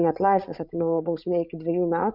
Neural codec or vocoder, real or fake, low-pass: codec, 16 kHz, 16 kbps, FreqCodec, larger model; fake; 5.4 kHz